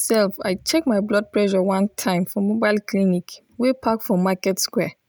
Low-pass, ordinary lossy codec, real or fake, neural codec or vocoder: none; none; real; none